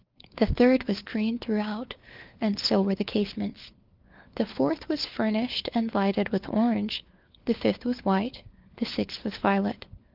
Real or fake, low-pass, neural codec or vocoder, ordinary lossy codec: fake; 5.4 kHz; codec, 16 kHz, 4 kbps, FunCodec, trained on LibriTTS, 50 frames a second; Opus, 32 kbps